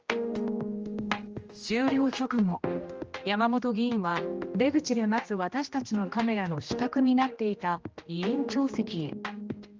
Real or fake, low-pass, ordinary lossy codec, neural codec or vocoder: fake; 7.2 kHz; Opus, 24 kbps; codec, 16 kHz, 1 kbps, X-Codec, HuBERT features, trained on general audio